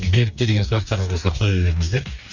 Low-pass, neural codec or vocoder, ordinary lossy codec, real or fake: 7.2 kHz; codec, 44.1 kHz, 2.6 kbps, DAC; none; fake